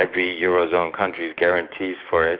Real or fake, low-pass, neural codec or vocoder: fake; 5.4 kHz; codec, 16 kHz, 6 kbps, DAC